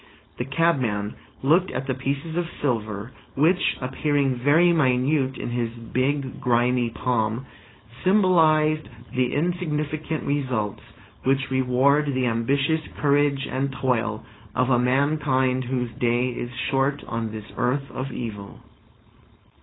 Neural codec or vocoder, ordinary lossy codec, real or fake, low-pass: codec, 16 kHz, 4.8 kbps, FACodec; AAC, 16 kbps; fake; 7.2 kHz